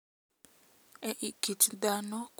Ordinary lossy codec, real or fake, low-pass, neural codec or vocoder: none; real; none; none